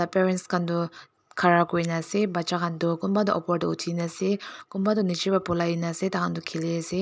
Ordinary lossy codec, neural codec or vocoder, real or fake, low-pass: none; none; real; none